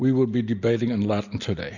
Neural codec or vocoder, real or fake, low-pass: none; real; 7.2 kHz